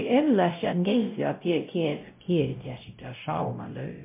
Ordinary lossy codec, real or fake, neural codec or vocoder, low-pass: MP3, 32 kbps; fake; codec, 16 kHz, 0.5 kbps, X-Codec, WavLM features, trained on Multilingual LibriSpeech; 3.6 kHz